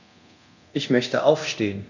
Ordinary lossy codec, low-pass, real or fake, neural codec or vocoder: none; 7.2 kHz; fake; codec, 24 kHz, 0.9 kbps, DualCodec